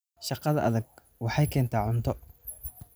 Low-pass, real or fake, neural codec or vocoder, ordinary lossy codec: none; real; none; none